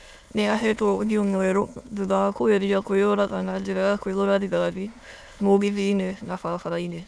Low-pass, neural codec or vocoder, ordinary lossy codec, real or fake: none; autoencoder, 22.05 kHz, a latent of 192 numbers a frame, VITS, trained on many speakers; none; fake